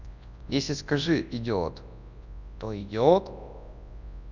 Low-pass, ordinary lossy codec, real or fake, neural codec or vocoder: 7.2 kHz; none; fake; codec, 24 kHz, 0.9 kbps, WavTokenizer, large speech release